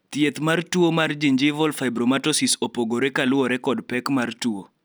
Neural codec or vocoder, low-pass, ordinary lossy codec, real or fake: none; none; none; real